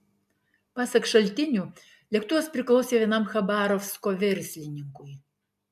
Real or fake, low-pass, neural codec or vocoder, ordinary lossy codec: real; 14.4 kHz; none; MP3, 96 kbps